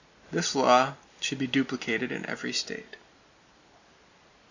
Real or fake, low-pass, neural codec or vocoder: real; 7.2 kHz; none